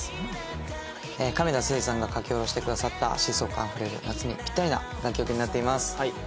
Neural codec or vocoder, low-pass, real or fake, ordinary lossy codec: none; none; real; none